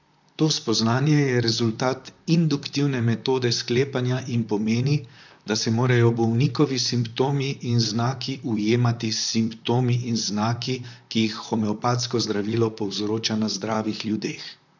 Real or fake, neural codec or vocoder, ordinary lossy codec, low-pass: fake; vocoder, 44.1 kHz, 128 mel bands, Pupu-Vocoder; none; 7.2 kHz